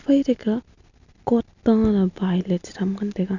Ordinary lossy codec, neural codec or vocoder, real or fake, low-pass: none; none; real; 7.2 kHz